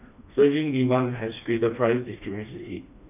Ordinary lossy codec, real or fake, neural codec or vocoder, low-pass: none; fake; codec, 16 kHz, 2 kbps, FreqCodec, smaller model; 3.6 kHz